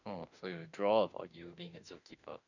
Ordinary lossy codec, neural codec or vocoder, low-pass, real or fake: none; autoencoder, 48 kHz, 32 numbers a frame, DAC-VAE, trained on Japanese speech; 7.2 kHz; fake